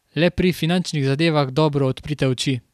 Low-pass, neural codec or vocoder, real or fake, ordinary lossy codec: 14.4 kHz; none; real; none